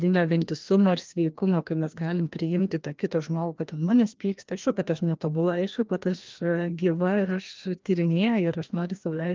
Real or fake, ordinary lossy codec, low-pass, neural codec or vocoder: fake; Opus, 32 kbps; 7.2 kHz; codec, 16 kHz, 1 kbps, FreqCodec, larger model